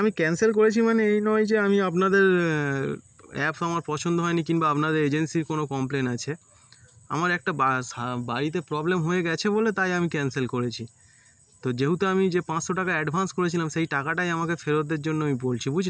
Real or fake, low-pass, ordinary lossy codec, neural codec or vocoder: real; none; none; none